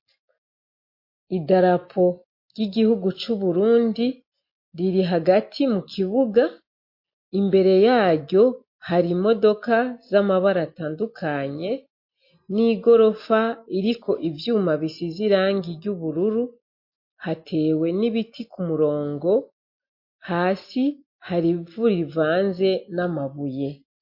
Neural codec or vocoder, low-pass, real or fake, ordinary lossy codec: none; 5.4 kHz; real; MP3, 32 kbps